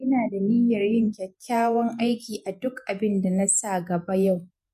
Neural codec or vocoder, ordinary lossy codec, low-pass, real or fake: vocoder, 48 kHz, 128 mel bands, Vocos; MP3, 64 kbps; 14.4 kHz; fake